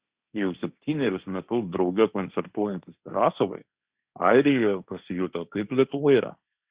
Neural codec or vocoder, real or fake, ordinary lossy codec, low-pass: codec, 16 kHz, 1.1 kbps, Voila-Tokenizer; fake; Opus, 64 kbps; 3.6 kHz